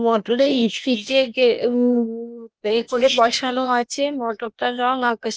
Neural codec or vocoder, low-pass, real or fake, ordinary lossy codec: codec, 16 kHz, 0.8 kbps, ZipCodec; none; fake; none